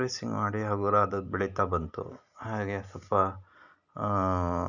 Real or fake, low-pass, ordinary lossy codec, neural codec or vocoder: real; 7.2 kHz; none; none